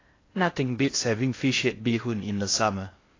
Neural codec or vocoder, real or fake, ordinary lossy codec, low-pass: codec, 16 kHz in and 24 kHz out, 0.6 kbps, FocalCodec, streaming, 2048 codes; fake; AAC, 32 kbps; 7.2 kHz